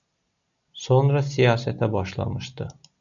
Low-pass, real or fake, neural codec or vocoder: 7.2 kHz; real; none